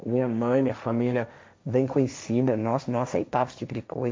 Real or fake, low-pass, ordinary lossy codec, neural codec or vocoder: fake; none; none; codec, 16 kHz, 1.1 kbps, Voila-Tokenizer